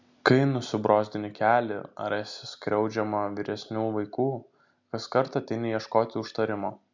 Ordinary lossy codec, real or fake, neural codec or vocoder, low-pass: MP3, 64 kbps; real; none; 7.2 kHz